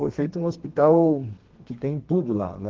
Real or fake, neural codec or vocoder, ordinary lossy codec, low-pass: fake; codec, 32 kHz, 1.9 kbps, SNAC; Opus, 16 kbps; 7.2 kHz